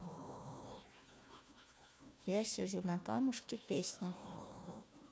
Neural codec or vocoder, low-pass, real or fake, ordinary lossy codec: codec, 16 kHz, 1 kbps, FunCodec, trained on Chinese and English, 50 frames a second; none; fake; none